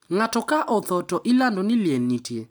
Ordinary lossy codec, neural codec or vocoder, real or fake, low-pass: none; none; real; none